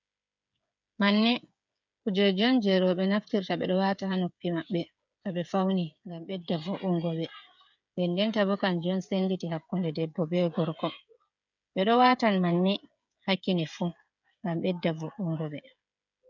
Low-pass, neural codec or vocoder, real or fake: 7.2 kHz; codec, 16 kHz, 8 kbps, FreqCodec, smaller model; fake